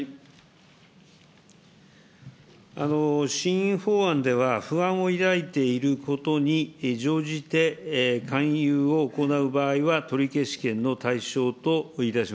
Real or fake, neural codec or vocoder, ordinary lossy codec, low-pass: real; none; none; none